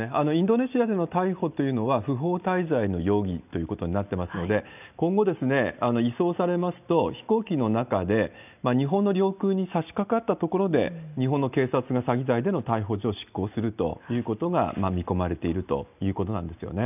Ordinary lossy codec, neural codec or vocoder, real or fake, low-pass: none; none; real; 3.6 kHz